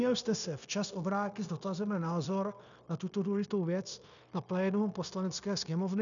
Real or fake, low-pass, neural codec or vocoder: fake; 7.2 kHz; codec, 16 kHz, 0.9 kbps, LongCat-Audio-Codec